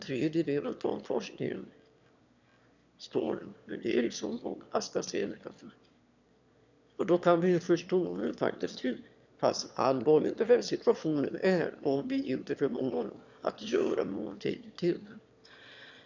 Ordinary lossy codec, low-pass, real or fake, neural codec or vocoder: none; 7.2 kHz; fake; autoencoder, 22.05 kHz, a latent of 192 numbers a frame, VITS, trained on one speaker